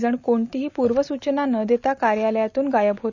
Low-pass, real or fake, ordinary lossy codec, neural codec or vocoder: 7.2 kHz; real; none; none